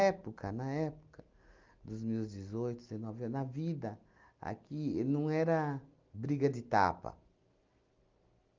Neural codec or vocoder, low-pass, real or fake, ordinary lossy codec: none; 7.2 kHz; real; Opus, 32 kbps